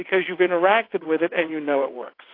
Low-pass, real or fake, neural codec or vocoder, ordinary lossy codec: 5.4 kHz; fake; vocoder, 22.05 kHz, 80 mel bands, WaveNeXt; AAC, 32 kbps